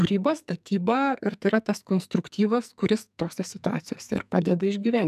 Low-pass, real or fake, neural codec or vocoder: 14.4 kHz; fake; codec, 44.1 kHz, 2.6 kbps, SNAC